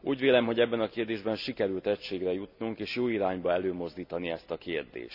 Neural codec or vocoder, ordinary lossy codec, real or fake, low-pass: none; none; real; 5.4 kHz